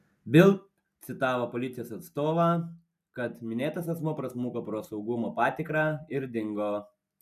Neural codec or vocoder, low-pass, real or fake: none; 14.4 kHz; real